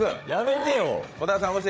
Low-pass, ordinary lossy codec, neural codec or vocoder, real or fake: none; none; codec, 16 kHz, 8 kbps, FreqCodec, larger model; fake